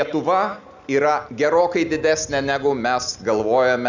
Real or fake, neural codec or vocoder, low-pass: real; none; 7.2 kHz